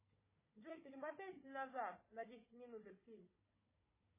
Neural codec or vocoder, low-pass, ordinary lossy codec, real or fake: codec, 16 kHz, 4 kbps, FunCodec, trained on Chinese and English, 50 frames a second; 3.6 kHz; MP3, 16 kbps; fake